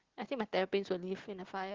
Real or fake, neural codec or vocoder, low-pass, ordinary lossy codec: real; none; 7.2 kHz; Opus, 16 kbps